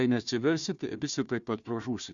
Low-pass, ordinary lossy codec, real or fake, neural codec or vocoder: 7.2 kHz; Opus, 64 kbps; fake; codec, 16 kHz, 1 kbps, FunCodec, trained on Chinese and English, 50 frames a second